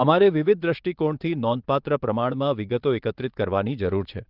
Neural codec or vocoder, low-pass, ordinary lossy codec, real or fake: vocoder, 22.05 kHz, 80 mel bands, WaveNeXt; 5.4 kHz; Opus, 24 kbps; fake